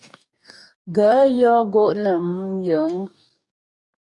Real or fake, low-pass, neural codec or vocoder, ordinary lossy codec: fake; 10.8 kHz; codec, 44.1 kHz, 2.6 kbps, DAC; MP3, 96 kbps